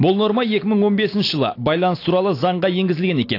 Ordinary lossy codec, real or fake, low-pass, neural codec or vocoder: AAC, 32 kbps; real; 5.4 kHz; none